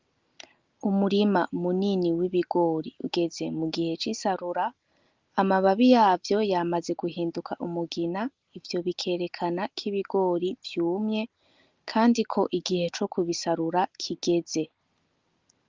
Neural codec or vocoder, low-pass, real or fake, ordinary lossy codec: none; 7.2 kHz; real; Opus, 24 kbps